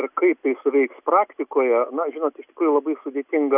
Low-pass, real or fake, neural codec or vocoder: 3.6 kHz; real; none